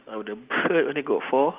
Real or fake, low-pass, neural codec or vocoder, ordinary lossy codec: real; 3.6 kHz; none; Opus, 32 kbps